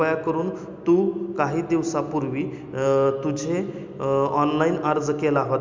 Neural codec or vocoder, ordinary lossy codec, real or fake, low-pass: none; MP3, 64 kbps; real; 7.2 kHz